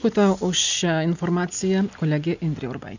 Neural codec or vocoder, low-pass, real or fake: none; 7.2 kHz; real